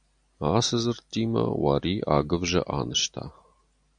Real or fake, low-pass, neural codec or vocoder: real; 9.9 kHz; none